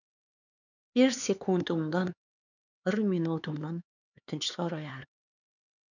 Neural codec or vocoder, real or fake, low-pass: codec, 16 kHz, 4 kbps, X-Codec, HuBERT features, trained on LibriSpeech; fake; 7.2 kHz